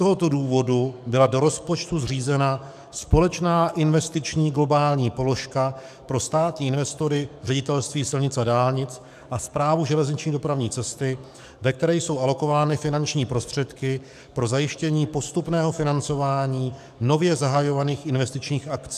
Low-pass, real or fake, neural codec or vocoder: 14.4 kHz; fake; codec, 44.1 kHz, 7.8 kbps, DAC